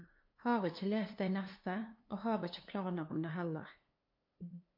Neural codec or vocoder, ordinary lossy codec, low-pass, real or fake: codec, 16 kHz, 2 kbps, FunCodec, trained on LibriTTS, 25 frames a second; MP3, 32 kbps; 5.4 kHz; fake